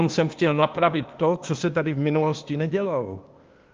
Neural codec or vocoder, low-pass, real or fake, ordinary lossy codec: codec, 16 kHz, 0.8 kbps, ZipCodec; 7.2 kHz; fake; Opus, 24 kbps